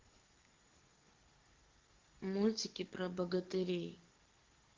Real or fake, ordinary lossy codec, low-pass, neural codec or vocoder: fake; Opus, 16 kbps; 7.2 kHz; codec, 44.1 kHz, 7.8 kbps, DAC